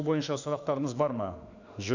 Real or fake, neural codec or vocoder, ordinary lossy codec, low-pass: fake; autoencoder, 48 kHz, 32 numbers a frame, DAC-VAE, trained on Japanese speech; none; 7.2 kHz